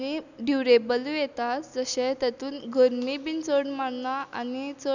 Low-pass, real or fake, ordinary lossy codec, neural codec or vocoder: 7.2 kHz; real; none; none